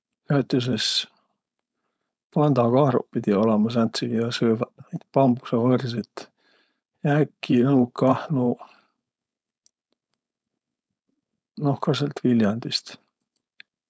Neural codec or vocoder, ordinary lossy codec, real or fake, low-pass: codec, 16 kHz, 4.8 kbps, FACodec; none; fake; none